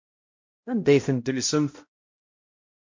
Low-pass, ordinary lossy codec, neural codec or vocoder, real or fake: 7.2 kHz; MP3, 48 kbps; codec, 16 kHz, 0.5 kbps, X-Codec, HuBERT features, trained on balanced general audio; fake